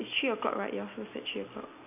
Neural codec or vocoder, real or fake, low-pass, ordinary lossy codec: none; real; 3.6 kHz; none